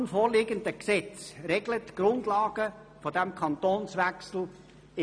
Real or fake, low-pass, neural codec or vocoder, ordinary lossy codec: real; 9.9 kHz; none; none